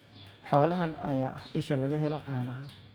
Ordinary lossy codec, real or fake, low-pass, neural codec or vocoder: none; fake; none; codec, 44.1 kHz, 2.6 kbps, DAC